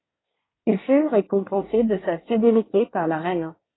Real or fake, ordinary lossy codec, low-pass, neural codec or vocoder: fake; AAC, 16 kbps; 7.2 kHz; codec, 24 kHz, 1 kbps, SNAC